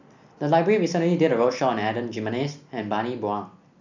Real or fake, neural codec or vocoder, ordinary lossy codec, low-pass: real; none; none; 7.2 kHz